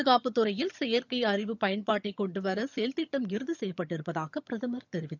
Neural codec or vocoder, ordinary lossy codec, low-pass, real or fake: vocoder, 22.05 kHz, 80 mel bands, HiFi-GAN; none; 7.2 kHz; fake